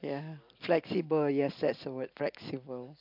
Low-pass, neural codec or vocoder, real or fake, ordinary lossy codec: 5.4 kHz; none; real; MP3, 48 kbps